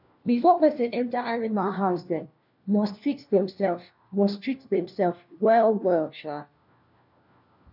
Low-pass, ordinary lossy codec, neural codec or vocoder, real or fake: 5.4 kHz; none; codec, 16 kHz, 1 kbps, FunCodec, trained on LibriTTS, 50 frames a second; fake